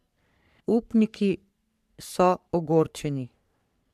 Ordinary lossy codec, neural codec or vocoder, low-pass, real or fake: MP3, 96 kbps; codec, 44.1 kHz, 3.4 kbps, Pupu-Codec; 14.4 kHz; fake